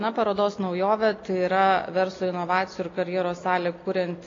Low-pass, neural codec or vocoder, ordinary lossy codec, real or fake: 7.2 kHz; none; AAC, 32 kbps; real